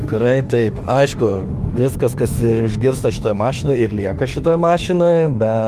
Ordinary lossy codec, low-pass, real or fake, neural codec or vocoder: Opus, 32 kbps; 14.4 kHz; fake; autoencoder, 48 kHz, 32 numbers a frame, DAC-VAE, trained on Japanese speech